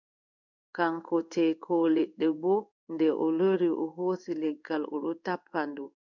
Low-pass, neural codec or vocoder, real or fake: 7.2 kHz; codec, 16 kHz in and 24 kHz out, 1 kbps, XY-Tokenizer; fake